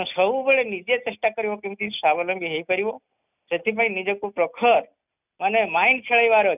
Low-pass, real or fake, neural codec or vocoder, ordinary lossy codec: 3.6 kHz; real; none; none